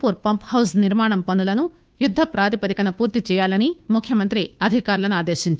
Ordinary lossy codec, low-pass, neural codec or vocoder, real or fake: none; none; codec, 16 kHz, 2 kbps, FunCodec, trained on Chinese and English, 25 frames a second; fake